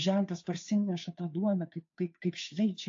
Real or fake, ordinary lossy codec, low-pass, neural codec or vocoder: fake; AAC, 48 kbps; 7.2 kHz; codec, 16 kHz, 2 kbps, FunCodec, trained on Chinese and English, 25 frames a second